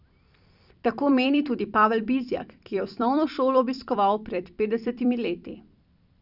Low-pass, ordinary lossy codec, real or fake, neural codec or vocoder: 5.4 kHz; none; fake; vocoder, 44.1 kHz, 128 mel bands every 256 samples, BigVGAN v2